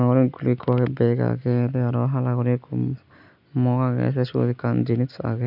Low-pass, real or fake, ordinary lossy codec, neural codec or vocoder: 5.4 kHz; real; none; none